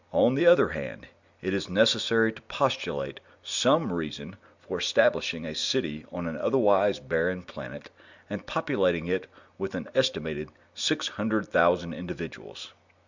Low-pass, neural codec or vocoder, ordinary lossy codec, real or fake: 7.2 kHz; none; Opus, 64 kbps; real